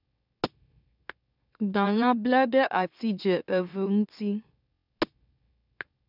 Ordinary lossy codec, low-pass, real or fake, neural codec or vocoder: none; 5.4 kHz; fake; autoencoder, 44.1 kHz, a latent of 192 numbers a frame, MeloTTS